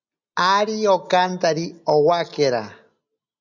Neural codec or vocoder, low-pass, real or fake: none; 7.2 kHz; real